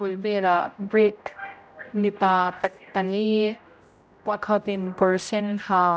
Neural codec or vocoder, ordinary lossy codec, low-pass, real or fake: codec, 16 kHz, 0.5 kbps, X-Codec, HuBERT features, trained on general audio; none; none; fake